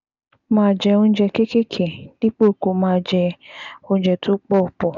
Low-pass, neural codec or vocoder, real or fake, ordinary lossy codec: 7.2 kHz; none; real; none